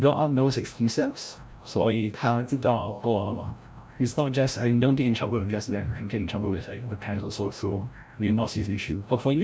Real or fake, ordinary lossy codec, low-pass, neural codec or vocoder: fake; none; none; codec, 16 kHz, 0.5 kbps, FreqCodec, larger model